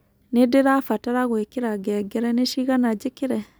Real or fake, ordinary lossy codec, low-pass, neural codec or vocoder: real; none; none; none